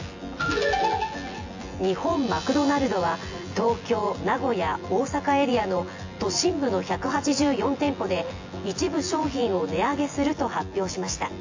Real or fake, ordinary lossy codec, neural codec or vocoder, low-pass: fake; AAC, 48 kbps; vocoder, 24 kHz, 100 mel bands, Vocos; 7.2 kHz